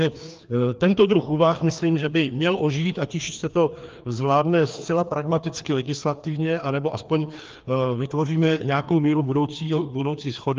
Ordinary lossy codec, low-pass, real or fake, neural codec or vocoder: Opus, 32 kbps; 7.2 kHz; fake; codec, 16 kHz, 2 kbps, FreqCodec, larger model